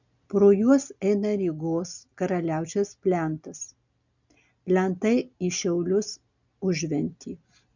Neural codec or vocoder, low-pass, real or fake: none; 7.2 kHz; real